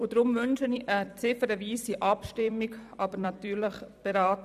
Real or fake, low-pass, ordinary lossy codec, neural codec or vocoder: fake; 14.4 kHz; none; vocoder, 44.1 kHz, 128 mel bands every 256 samples, BigVGAN v2